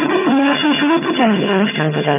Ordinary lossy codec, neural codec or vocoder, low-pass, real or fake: none; vocoder, 22.05 kHz, 80 mel bands, HiFi-GAN; 3.6 kHz; fake